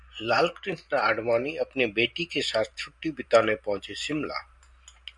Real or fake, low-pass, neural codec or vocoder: fake; 10.8 kHz; vocoder, 44.1 kHz, 128 mel bands every 512 samples, BigVGAN v2